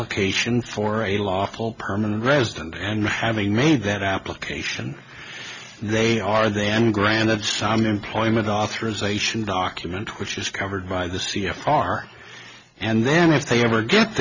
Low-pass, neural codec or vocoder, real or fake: 7.2 kHz; none; real